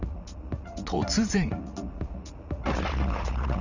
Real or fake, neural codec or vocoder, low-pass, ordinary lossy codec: fake; vocoder, 44.1 kHz, 80 mel bands, Vocos; 7.2 kHz; none